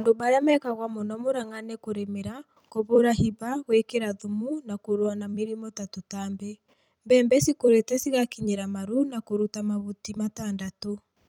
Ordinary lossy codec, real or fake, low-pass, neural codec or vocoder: none; fake; 19.8 kHz; vocoder, 44.1 kHz, 128 mel bands every 256 samples, BigVGAN v2